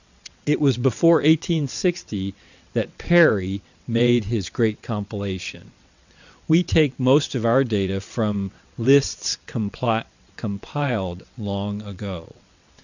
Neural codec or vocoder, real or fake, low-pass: vocoder, 22.05 kHz, 80 mel bands, WaveNeXt; fake; 7.2 kHz